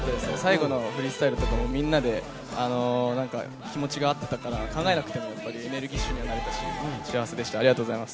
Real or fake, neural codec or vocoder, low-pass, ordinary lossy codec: real; none; none; none